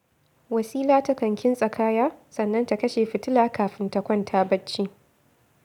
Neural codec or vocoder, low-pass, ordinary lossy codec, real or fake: none; 19.8 kHz; none; real